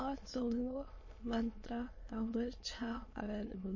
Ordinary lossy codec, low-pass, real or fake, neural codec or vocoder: MP3, 32 kbps; 7.2 kHz; fake; autoencoder, 22.05 kHz, a latent of 192 numbers a frame, VITS, trained on many speakers